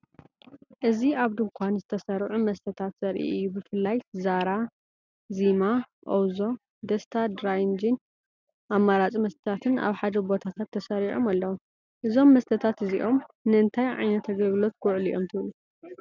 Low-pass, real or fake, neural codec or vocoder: 7.2 kHz; real; none